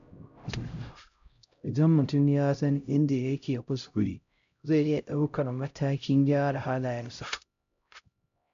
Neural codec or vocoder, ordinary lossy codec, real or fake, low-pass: codec, 16 kHz, 0.5 kbps, X-Codec, HuBERT features, trained on LibriSpeech; MP3, 64 kbps; fake; 7.2 kHz